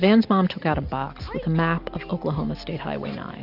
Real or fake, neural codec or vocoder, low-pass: real; none; 5.4 kHz